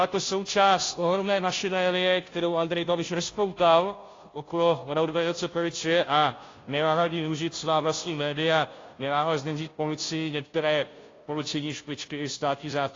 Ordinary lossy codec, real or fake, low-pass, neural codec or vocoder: AAC, 32 kbps; fake; 7.2 kHz; codec, 16 kHz, 0.5 kbps, FunCodec, trained on Chinese and English, 25 frames a second